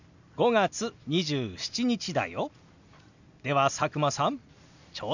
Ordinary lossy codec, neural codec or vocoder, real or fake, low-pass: none; none; real; 7.2 kHz